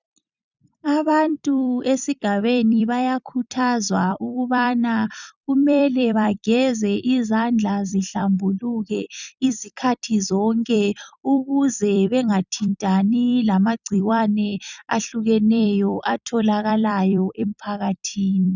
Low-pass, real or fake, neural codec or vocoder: 7.2 kHz; fake; vocoder, 44.1 kHz, 128 mel bands every 256 samples, BigVGAN v2